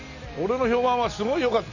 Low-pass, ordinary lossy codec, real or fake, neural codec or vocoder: 7.2 kHz; none; real; none